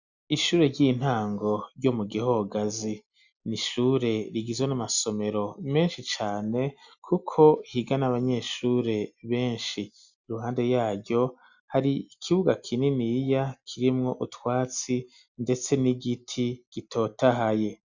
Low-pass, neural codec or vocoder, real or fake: 7.2 kHz; none; real